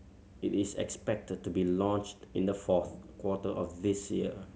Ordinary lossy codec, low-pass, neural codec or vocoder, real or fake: none; none; none; real